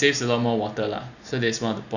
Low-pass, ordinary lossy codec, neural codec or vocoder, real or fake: 7.2 kHz; none; none; real